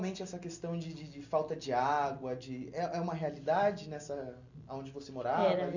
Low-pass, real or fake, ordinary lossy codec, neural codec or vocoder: 7.2 kHz; real; none; none